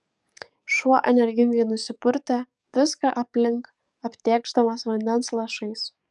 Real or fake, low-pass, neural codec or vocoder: fake; 10.8 kHz; codec, 44.1 kHz, 7.8 kbps, DAC